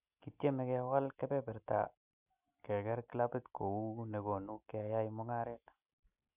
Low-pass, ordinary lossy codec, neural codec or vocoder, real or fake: 3.6 kHz; none; none; real